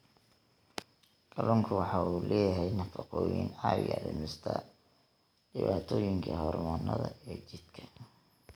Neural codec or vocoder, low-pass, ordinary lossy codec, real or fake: none; none; none; real